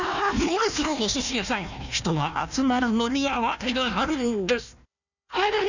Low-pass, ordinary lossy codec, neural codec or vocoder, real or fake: 7.2 kHz; none; codec, 16 kHz, 1 kbps, FunCodec, trained on Chinese and English, 50 frames a second; fake